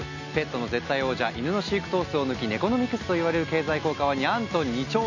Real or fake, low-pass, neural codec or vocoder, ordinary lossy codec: real; 7.2 kHz; none; none